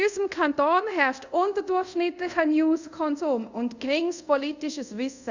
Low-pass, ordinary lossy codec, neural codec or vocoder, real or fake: 7.2 kHz; Opus, 64 kbps; codec, 24 kHz, 0.5 kbps, DualCodec; fake